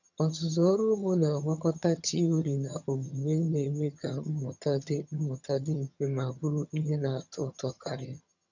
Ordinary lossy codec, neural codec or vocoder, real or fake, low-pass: none; vocoder, 22.05 kHz, 80 mel bands, HiFi-GAN; fake; 7.2 kHz